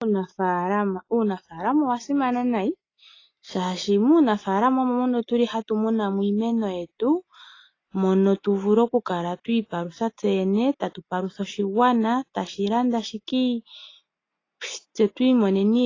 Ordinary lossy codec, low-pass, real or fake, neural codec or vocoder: AAC, 32 kbps; 7.2 kHz; real; none